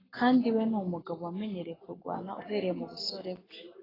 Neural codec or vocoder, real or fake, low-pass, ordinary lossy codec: codec, 44.1 kHz, 7.8 kbps, Pupu-Codec; fake; 5.4 kHz; AAC, 24 kbps